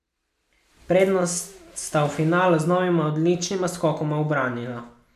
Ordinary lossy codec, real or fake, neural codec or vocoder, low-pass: none; real; none; 14.4 kHz